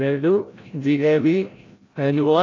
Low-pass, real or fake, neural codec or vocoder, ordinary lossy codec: 7.2 kHz; fake; codec, 16 kHz, 0.5 kbps, FreqCodec, larger model; AAC, 32 kbps